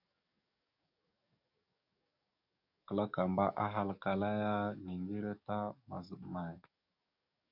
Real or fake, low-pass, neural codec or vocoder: fake; 5.4 kHz; codec, 44.1 kHz, 7.8 kbps, DAC